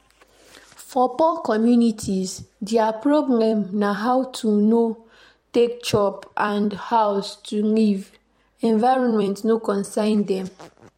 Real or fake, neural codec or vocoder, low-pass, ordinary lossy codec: fake; vocoder, 44.1 kHz, 128 mel bands every 256 samples, BigVGAN v2; 19.8 kHz; MP3, 64 kbps